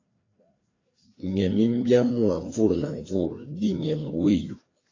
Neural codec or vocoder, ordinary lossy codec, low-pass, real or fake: codec, 16 kHz, 2 kbps, FreqCodec, larger model; AAC, 32 kbps; 7.2 kHz; fake